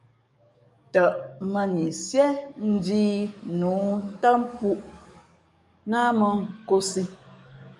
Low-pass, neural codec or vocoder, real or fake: 10.8 kHz; codec, 44.1 kHz, 7.8 kbps, DAC; fake